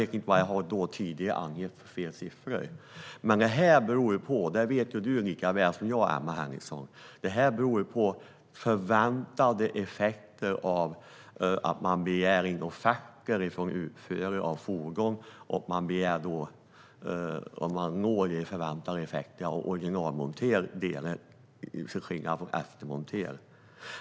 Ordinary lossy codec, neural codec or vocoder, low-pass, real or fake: none; none; none; real